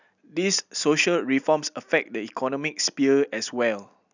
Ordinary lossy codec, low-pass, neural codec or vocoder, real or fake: none; 7.2 kHz; none; real